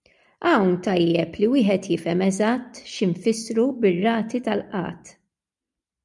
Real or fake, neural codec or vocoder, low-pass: real; none; 10.8 kHz